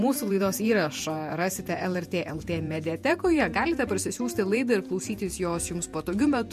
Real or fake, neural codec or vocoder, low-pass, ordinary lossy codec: fake; vocoder, 48 kHz, 128 mel bands, Vocos; 14.4 kHz; MP3, 64 kbps